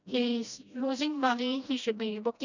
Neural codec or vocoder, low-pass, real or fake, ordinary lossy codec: codec, 16 kHz, 1 kbps, FreqCodec, smaller model; 7.2 kHz; fake; none